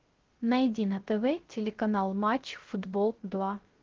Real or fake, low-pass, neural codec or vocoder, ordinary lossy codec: fake; 7.2 kHz; codec, 16 kHz, 0.3 kbps, FocalCodec; Opus, 16 kbps